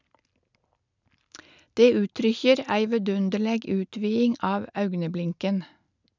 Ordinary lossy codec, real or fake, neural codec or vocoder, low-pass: none; real; none; 7.2 kHz